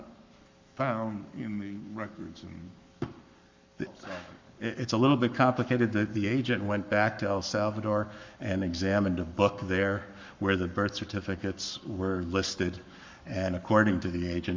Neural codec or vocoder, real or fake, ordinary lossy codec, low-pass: codec, 44.1 kHz, 7.8 kbps, Pupu-Codec; fake; MP3, 64 kbps; 7.2 kHz